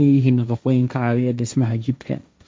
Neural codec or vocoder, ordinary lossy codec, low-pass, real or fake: codec, 16 kHz, 1.1 kbps, Voila-Tokenizer; none; none; fake